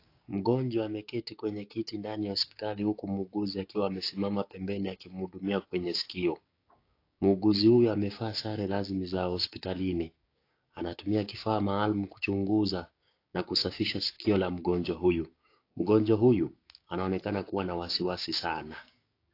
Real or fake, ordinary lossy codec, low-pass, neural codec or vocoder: fake; AAC, 32 kbps; 5.4 kHz; codec, 16 kHz, 6 kbps, DAC